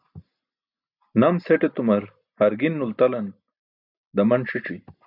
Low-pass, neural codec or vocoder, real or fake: 5.4 kHz; none; real